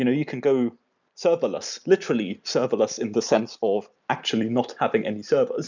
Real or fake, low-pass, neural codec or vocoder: real; 7.2 kHz; none